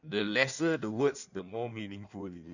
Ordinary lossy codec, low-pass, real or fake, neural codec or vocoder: none; 7.2 kHz; fake; codec, 16 kHz in and 24 kHz out, 1.1 kbps, FireRedTTS-2 codec